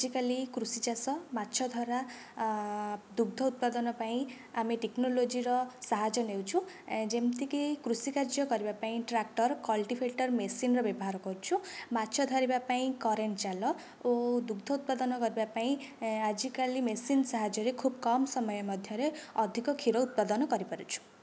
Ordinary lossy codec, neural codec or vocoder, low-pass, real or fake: none; none; none; real